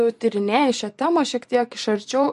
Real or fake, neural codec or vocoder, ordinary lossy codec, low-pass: fake; vocoder, 44.1 kHz, 128 mel bands every 512 samples, BigVGAN v2; MP3, 48 kbps; 14.4 kHz